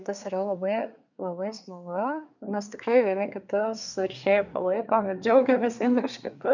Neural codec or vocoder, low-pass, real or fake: codec, 24 kHz, 1 kbps, SNAC; 7.2 kHz; fake